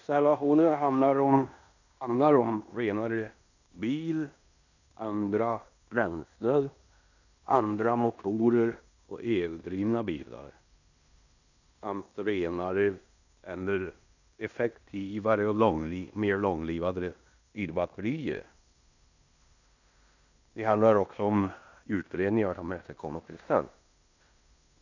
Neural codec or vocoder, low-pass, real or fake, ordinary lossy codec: codec, 16 kHz in and 24 kHz out, 0.9 kbps, LongCat-Audio-Codec, fine tuned four codebook decoder; 7.2 kHz; fake; none